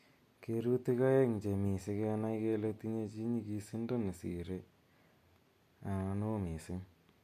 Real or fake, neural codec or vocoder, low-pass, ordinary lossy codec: real; none; 14.4 kHz; MP3, 64 kbps